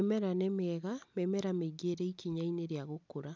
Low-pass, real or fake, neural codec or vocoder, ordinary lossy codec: none; real; none; none